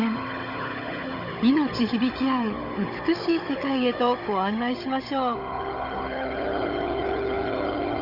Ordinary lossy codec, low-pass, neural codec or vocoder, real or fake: Opus, 32 kbps; 5.4 kHz; codec, 16 kHz, 16 kbps, FunCodec, trained on Chinese and English, 50 frames a second; fake